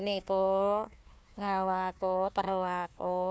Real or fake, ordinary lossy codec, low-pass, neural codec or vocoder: fake; none; none; codec, 16 kHz, 1 kbps, FunCodec, trained on Chinese and English, 50 frames a second